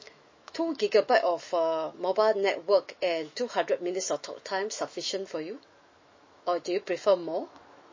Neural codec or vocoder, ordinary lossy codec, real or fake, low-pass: autoencoder, 48 kHz, 128 numbers a frame, DAC-VAE, trained on Japanese speech; MP3, 32 kbps; fake; 7.2 kHz